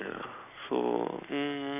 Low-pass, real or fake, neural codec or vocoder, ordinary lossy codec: 3.6 kHz; real; none; none